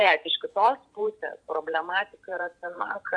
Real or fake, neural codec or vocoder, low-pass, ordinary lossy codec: fake; vocoder, 44.1 kHz, 128 mel bands every 512 samples, BigVGAN v2; 9.9 kHz; AAC, 64 kbps